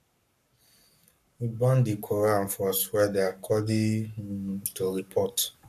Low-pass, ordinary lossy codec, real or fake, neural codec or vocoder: 14.4 kHz; none; fake; codec, 44.1 kHz, 7.8 kbps, Pupu-Codec